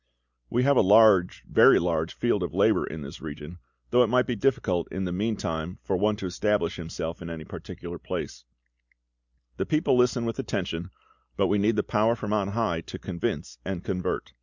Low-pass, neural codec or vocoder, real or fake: 7.2 kHz; none; real